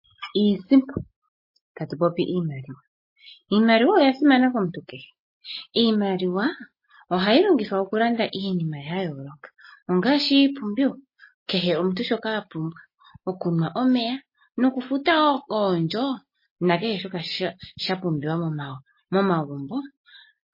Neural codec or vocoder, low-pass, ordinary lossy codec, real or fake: none; 5.4 kHz; MP3, 24 kbps; real